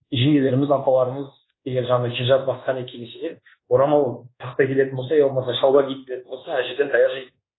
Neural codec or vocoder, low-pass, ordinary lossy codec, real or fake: codec, 24 kHz, 1.2 kbps, DualCodec; 7.2 kHz; AAC, 16 kbps; fake